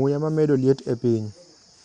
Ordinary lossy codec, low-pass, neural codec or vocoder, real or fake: Opus, 64 kbps; 9.9 kHz; none; real